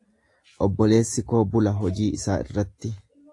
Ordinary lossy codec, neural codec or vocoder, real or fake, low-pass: AAC, 48 kbps; none; real; 10.8 kHz